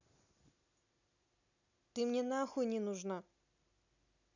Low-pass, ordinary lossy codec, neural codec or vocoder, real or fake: 7.2 kHz; none; none; real